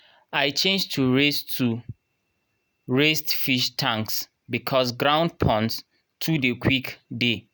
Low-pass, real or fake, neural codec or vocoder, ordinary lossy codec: none; real; none; none